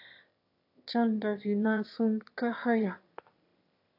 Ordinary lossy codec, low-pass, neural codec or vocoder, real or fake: AAC, 32 kbps; 5.4 kHz; autoencoder, 22.05 kHz, a latent of 192 numbers a frame, VITS, trained on one speaker; fake